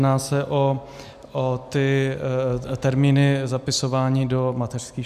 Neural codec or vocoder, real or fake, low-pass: none; real; 14.4 kHz